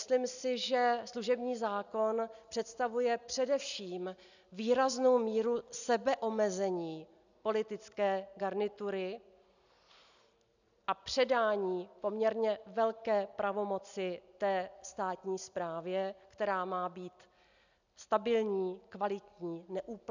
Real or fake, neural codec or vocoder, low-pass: real; none; 7.2 kHz